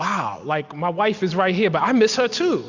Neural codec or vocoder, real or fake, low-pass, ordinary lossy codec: none; real; 7.2 kHz; Opus, 64 kbps